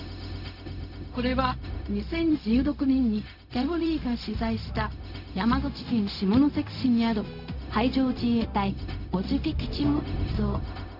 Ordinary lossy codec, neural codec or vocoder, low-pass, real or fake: none; codec, 16 kHz, 0.4 kbps, LongCat-Audio-Codec; 5.4 kHz; fake